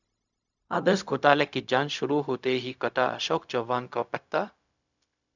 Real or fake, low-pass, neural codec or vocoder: fake; 7.2 kHz; codec, 16 kHz, 0.4 kbps, LongCat-Audio-Codec